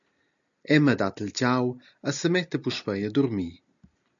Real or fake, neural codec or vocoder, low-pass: real; none; 7.2 kHz